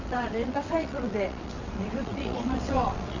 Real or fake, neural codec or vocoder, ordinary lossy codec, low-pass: fake; vocoder, 22.05 kHz, 80 mel bands, WaveNeXt; none; 7.2 kHz